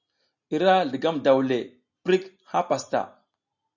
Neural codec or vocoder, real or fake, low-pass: none; real; 7.2 kHz